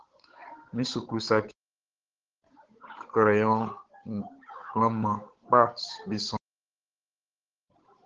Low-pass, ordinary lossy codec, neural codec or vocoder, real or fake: 7.2 kHz; Opus, 16 kbps; codec, 16 kHz, 8 kbps, FunCodec, trained on Chinese and English, 25 frames a second; fake